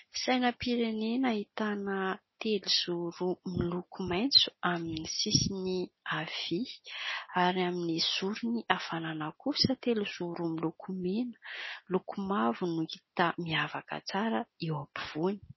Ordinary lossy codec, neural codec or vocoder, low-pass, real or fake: MP3, 24 kbps; none; 7.2 kHz; real